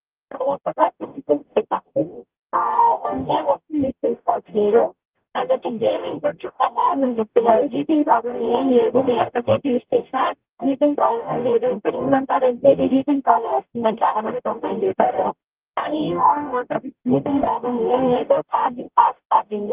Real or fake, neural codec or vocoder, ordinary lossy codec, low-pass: fake; codec, 44.1 kHz, 0.9 kbps, DAC; Opus, 32 kbps; 3.6 kHz